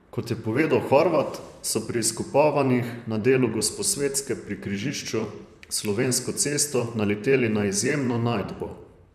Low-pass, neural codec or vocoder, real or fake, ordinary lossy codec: 14.4 kHz; vocoder, 44.1 kHz, 128 mel bands, Pupu-Vocoder; fake; none